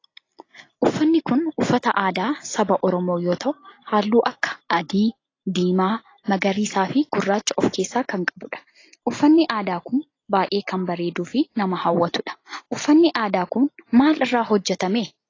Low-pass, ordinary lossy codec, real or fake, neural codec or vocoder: 7.2 kHz; AAC, 32 kbps; real; none